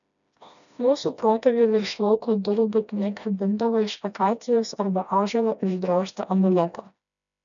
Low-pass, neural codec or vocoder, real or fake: 7.2 kHz; codec, 16 kHz, 1 kbps, FreqCodec, smaller model; fake